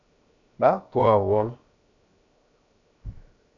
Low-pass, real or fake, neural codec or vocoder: 7.2 kHz; fake; codec, 16 kHz, 0.7 kbps, FocalCodec